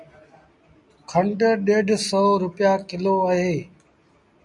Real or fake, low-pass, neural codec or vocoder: real; 10.8 kHz; none